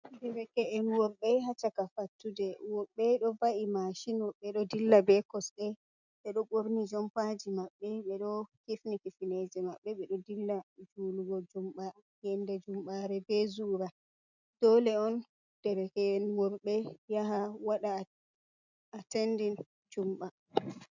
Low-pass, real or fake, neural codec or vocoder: 7.2 kHz; real; none